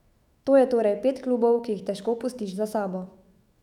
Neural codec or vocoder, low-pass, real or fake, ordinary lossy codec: autoencoder, 48 kHz, 128 numbers a frame, DAC-VAE, trained on Japanese speech; 19.8 kHz; fake; none